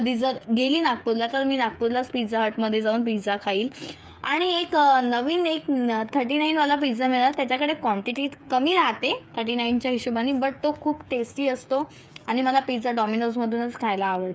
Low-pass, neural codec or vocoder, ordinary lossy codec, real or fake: none; codec, 16 kHz, 8 kbps, FreqCodec, smaller model; none; fake